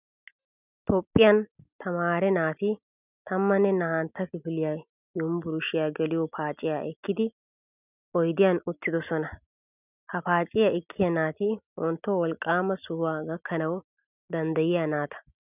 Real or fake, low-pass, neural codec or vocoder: real; 3.6 kHz; none